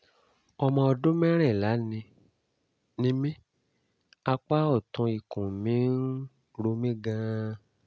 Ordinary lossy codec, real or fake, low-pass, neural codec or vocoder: none; real; none; none